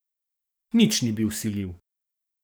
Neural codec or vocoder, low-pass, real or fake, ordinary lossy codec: codec, 44.1 kHz, 7.8 kbps, DAC; none; fake; none